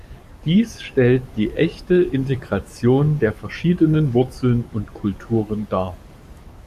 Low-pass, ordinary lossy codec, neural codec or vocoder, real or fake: 14.4 kHz; Opus, 64 kbps; codec, 44.1 kHz, 7.8 kbps, DAC; fake